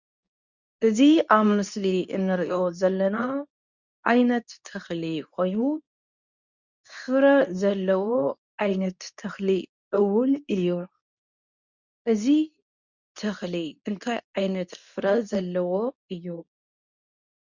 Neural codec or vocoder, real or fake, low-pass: codec, 24 kHz, 0.9 kbps, WavTokenizer, medium speech release version 2; fake; 7.2 kHz